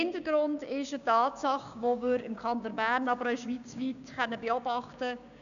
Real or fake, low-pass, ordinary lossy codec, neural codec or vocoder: fake; 7.2 kHz; none; codec, 16 kHz, 6 kbps, DAC